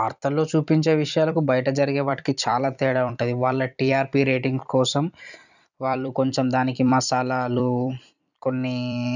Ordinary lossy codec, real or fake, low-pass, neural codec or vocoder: none; fake; 7.2 kHz; vocoder, 44.1 kHz, 128 mel bands every 256 samples, BigVGAN v2